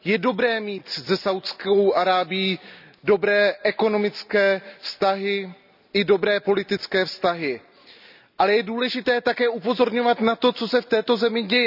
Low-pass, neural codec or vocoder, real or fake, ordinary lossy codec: 5.4 kHz; none; real; none